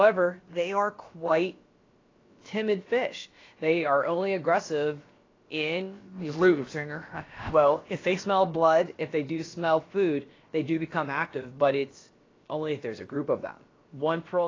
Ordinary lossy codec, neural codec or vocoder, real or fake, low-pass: AAC, 32 kbps; codec, 16 kHz, about 1 kbps, DyCAST, with the encoder's durations; fake; 7.2 kHz